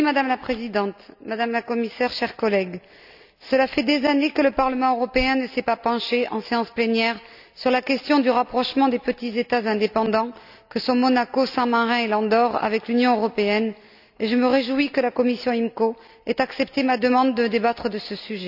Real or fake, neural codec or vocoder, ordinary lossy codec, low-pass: real; none; none; 5.4 kHz